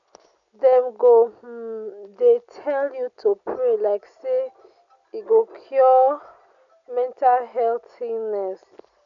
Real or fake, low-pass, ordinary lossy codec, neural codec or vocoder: real; 7.2 kHz; none; none